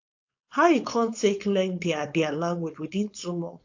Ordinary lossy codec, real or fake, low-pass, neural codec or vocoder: AAC, 48 kbps; fake; 7.2 kHz; codec, 16 kHz, 4.8 kbps, FACodec